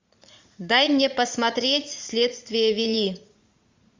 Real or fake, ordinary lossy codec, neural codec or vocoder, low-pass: fake; MP3, 64 kbps; vocoder, 44.1 kHz, 80 mel bands, Vocos; 7.2 kHz